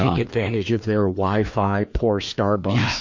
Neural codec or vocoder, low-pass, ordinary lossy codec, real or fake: codec, 16 kHz, 2 kbps, FreqCodec, larger model; 7.2 kHz; MP3, 48 kbps; fake